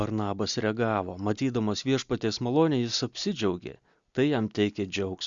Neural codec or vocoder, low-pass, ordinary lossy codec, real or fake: none; 7.2 kHz; Opus, 64 kbps; real